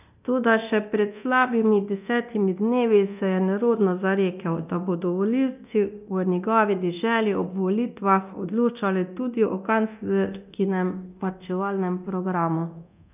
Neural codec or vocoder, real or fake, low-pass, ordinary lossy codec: codec, 24 kHz, 0.9 kbps, DualCodec; fake; 3.6 kHz; none